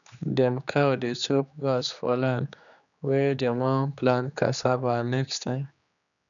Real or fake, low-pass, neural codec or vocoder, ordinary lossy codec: fake; 7.2 kHz; codec, 16 kHz, 2 kbps, X-Codec, HuBERT features, trained on general audio; none